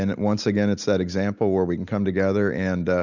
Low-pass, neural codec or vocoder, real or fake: 7.2 kHz; none; real